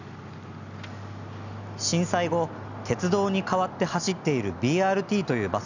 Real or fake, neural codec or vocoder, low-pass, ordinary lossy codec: real; none; 7.2 kHz; none